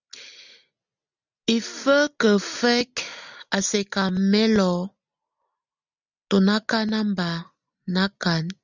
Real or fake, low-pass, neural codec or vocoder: real; 7.2 kHz; none